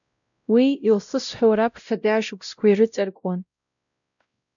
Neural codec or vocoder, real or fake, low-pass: codec, 16 kHz, 0.5 kbps, X-Codec, WavLM features, trained on Multilingual LibriSpeech; fake; 7.2 kHz